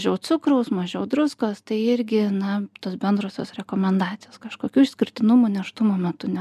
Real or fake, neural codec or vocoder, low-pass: real; none; 14.4 kHz